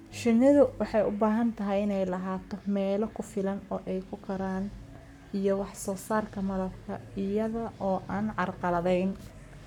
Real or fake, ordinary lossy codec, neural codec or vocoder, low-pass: fake; none; codec, 44.1 kHz, 7.8 kbps, Pupu-Codec; 19.8 kHz